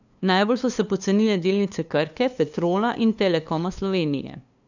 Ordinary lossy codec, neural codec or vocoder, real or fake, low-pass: none; codec, 16 kHz, 2 kbps, FunCodec, trained on LibriTTS, 25 frames a second; fake; 7.2 kHz